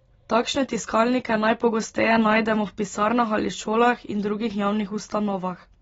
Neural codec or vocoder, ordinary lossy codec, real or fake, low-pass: none; AAC, 24 kbps; real; 19.8 kHz